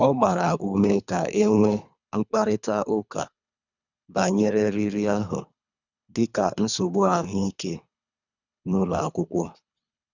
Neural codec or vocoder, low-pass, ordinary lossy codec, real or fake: codec, 24 kHz, 3 kbps, HILCodec; 7.2 kHz; none; fake